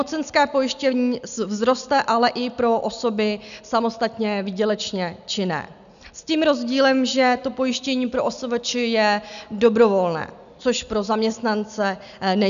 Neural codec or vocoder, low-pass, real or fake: none; 7.2 kHz; real